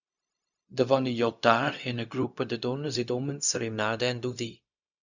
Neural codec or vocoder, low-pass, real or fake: codec, 16 kHz, 0.4 kbps, LongCat-Audio-Codec; 7.2 kHz; fake